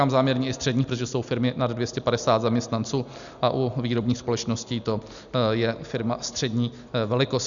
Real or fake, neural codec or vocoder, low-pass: real; none; 7.2 kHz